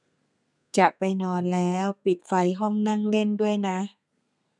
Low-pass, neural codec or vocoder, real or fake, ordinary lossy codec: 10.8 kHz; codec, 32 kHz, 1.9 kbps, SNAC; fake; none